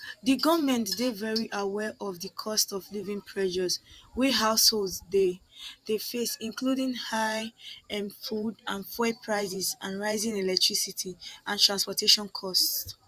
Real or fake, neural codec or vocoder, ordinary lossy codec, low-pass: fake; vocoder, 44.1 kHz, 128 mel bands every 512 samples, BigVGAN v2; Opus, 64 kbps; 14.4 kHz